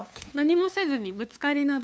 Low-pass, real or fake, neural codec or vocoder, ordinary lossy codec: none; fake; codec, 16 kHz, 2 kbps, FunCodec, trained on LibriTTS, 25 frames a second; none